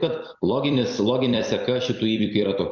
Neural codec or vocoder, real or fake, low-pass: vocoder, 44.1 kHz, 128 mel bands every 256 samples, BigVGAN v2; fake; 7.2 kHz